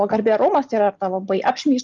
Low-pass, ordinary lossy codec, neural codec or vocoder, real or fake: 7.2 kHz; Opus, 32 kbps; none; real